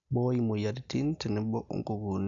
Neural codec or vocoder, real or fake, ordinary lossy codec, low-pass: none; real; none; 7.2 kHz